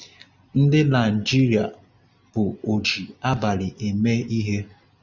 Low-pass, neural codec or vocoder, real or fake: 7.2 kHz; none; real